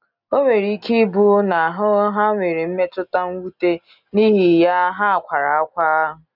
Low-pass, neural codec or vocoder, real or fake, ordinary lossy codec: 5.4 kHz; none; real; none